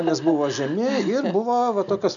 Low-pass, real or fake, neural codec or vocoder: 7.2 kHz; real; none